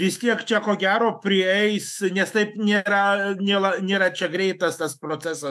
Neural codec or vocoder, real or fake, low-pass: autoencoder, 48 kHz, 128 numbers a frame, DAC-VAE, trained on Japanese speech; fake; 14.4 kHz